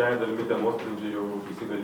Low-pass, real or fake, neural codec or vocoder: 19.8 kHz; fake; vocoder, 44.1 kHz, 128 mel bands every 256 samples, BigVGAN v2